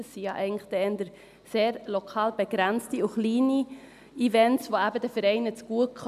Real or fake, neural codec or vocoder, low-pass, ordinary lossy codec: real; none; 14.4 kHz; none